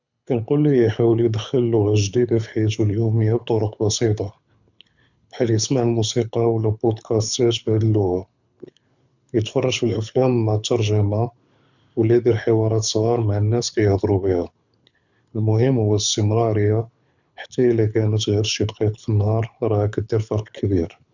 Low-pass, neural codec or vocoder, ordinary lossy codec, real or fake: 7.2 kHz; codec, 24 kHz, 6 kbps, HILCodec; none; fake